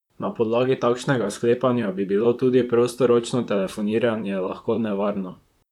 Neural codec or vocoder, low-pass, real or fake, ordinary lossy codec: vocoder, 44.1 kHz, 128 mel bands, Pupu-Vocoder; 19.8 kHz; fake; none